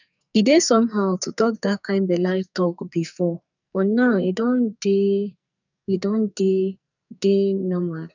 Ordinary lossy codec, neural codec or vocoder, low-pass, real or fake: none; codec, 44.1 kHz, 2.6 kbps, SNAC; 7.2 kHz; fake